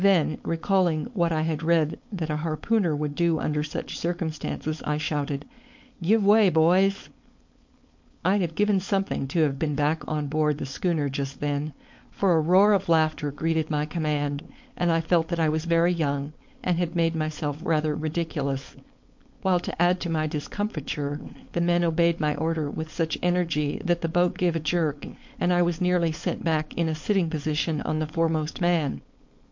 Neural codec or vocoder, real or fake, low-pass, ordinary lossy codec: codec, 16 kHz, 4.8 kbps, FACodec; fake; 7.2 kHz; MP3, 48 kbps